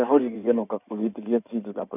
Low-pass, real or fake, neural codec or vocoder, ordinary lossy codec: 3.6 kHz; fake; codec, 16 kHz in and 24 kHz out, 2.2 kbps, FireRedTTS-2 codec; none